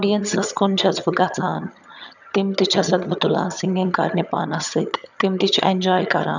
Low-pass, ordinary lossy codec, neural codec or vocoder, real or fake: 7.2 kHz; none; vocoder, 22.05 kHz, 80 mel bands, HiFi-GAN; fake